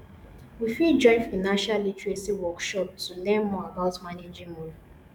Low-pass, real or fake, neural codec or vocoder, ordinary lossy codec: 19.8 kHz; fake; codec, 44.1 kHz, 7.8 kbps, DAC; none